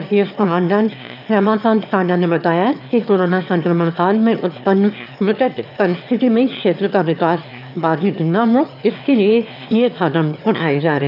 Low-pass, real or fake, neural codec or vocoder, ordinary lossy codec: 5.4 kHz; fake; autoencoder, 22.05 kHz, a latent of 192 numbers a frame, VITS, trained on one speaker; none